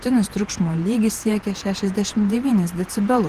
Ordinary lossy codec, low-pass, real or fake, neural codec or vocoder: Opus, 16 kbps; 14.4 kHz; fake; vocoder, 48 kHz, 128 mel bands, Vocos